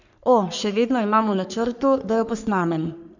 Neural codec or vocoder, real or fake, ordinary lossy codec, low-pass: codec, 44.1 kHz, 3.4 kbps, Pupu-Codec; fake; none; 7.2 kHz